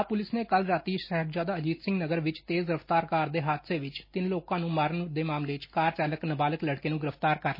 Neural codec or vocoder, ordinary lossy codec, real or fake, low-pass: none; MP3, 24 kbps; real; 5.4 kHz